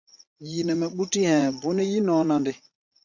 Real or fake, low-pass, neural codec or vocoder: fake; 7.2 kHz; vocoder, 44.1 kHz, 80 mel bands, Vocos